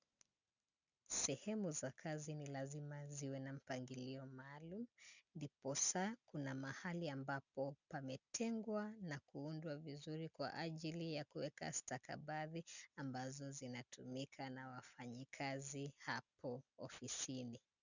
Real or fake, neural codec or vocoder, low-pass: real; none; 7.2 kHz